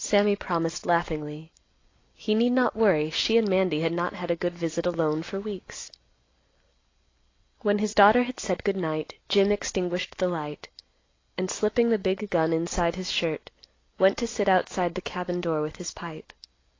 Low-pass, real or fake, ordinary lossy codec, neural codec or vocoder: 7.2 kHz; real; AAC, 32 kbps; none